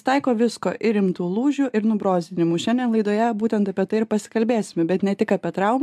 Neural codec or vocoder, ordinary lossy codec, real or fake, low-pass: none; AAC, 96 kbps; real; 14.4 kHz